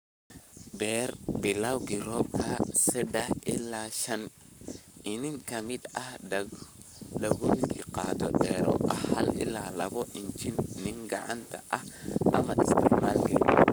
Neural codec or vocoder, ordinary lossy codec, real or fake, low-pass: codec, 44.1 kHz, 7.8 kbps, Pupu-Codec; none; fake; none